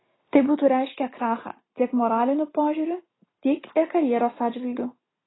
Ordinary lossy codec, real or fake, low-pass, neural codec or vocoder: AAC, 16 kbps; real; 7.2 kHz; none